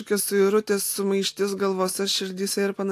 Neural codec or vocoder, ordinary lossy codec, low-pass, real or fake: none; AAC, 64 kbps; 14.4 kHz; real